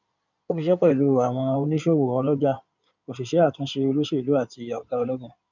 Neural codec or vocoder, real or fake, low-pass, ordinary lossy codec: codec, 16 kHz in and 24 kHz out, 2.2 kbps, FireRedTTS-2 codec; fake; 7.2 kHz; none